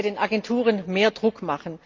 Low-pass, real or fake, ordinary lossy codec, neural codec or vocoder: 7.2 kHz; real; Opus, 32 kbps; none